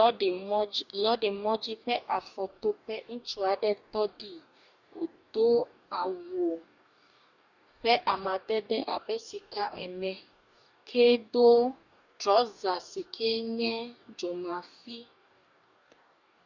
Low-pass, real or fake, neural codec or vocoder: 7.2 kHz; fake; codec, 44.1 kHz, 2.6 kbps, DAC